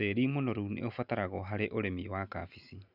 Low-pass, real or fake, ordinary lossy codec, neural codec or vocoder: 5.4 kHz; real; none; none